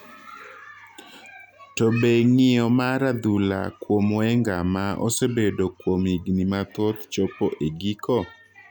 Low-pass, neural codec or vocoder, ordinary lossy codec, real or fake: 19.8 kHz; none; none; real